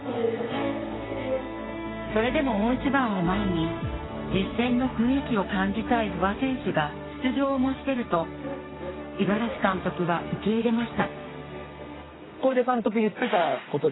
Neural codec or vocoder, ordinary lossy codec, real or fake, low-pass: codec, 32 kHz, 1.9 kbps, SNAC; AAC, 16 kbps; fake; 7.2 kHz